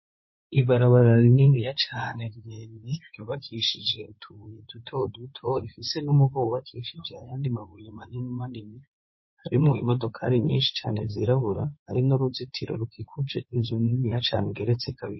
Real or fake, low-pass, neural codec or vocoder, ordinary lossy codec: fake; 7.2 kHz; codec, 16 kHz in and 24 kHz out, 2.2 kbps, FireRedTTS-2 codec; MP3, 24 kbps